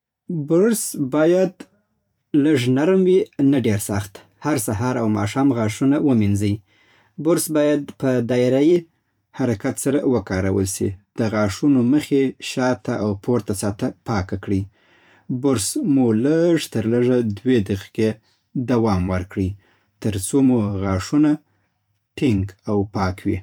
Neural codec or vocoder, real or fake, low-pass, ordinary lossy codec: vocoder, 44.1 kHz, 128 mel bands every 512 samples, BigVGAN v2; fake; 19.8 kHz; none